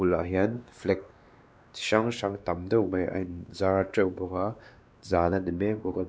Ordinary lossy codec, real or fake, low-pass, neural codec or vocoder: none; fake; none; codec, 16 kHz, 2 kbps, X-Codec, WavLM features, trained on Multilingual LibriSpeech